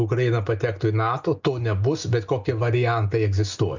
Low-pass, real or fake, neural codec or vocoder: 7.2 kHz; real; none